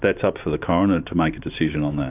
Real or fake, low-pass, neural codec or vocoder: fake; 3.6 kHz; vocoder, 44.1 kHz, 128 mel bands every 512 samples, BigVGAN v2